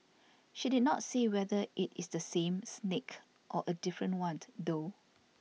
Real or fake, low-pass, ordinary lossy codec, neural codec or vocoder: real; none; none; none